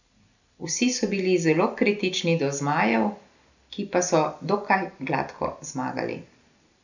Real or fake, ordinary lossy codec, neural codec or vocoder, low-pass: real; none; none; 7.2 kHz